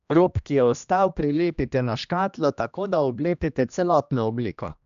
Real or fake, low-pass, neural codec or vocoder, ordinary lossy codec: fake; 7.2 kHz; codec, 16 kHz, 1 kbps, X-Codec, HuBERT features, trained on general audio; AAC, 96 kbps